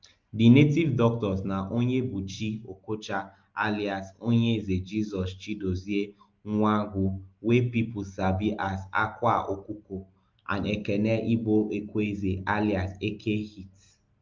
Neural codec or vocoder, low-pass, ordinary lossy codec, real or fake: none; 7.2 kHz; Opus, 24 kbps; real